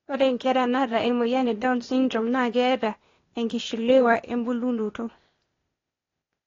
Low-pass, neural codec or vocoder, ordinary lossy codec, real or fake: 7.2 kHz; codec, 16 kHz, 0.8 kbps, ZipCodec; AAC, 32 kbps; fake